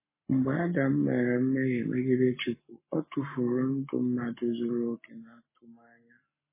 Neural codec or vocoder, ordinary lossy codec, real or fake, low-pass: codec, 44.1 kHz, 7.8 kbps, Pupu-Codec; MP3, 16 kbps; fake; 3.6 kHz